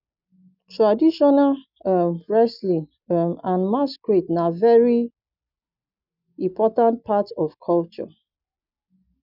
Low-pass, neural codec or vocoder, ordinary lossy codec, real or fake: 5.4 kHz; none; none; real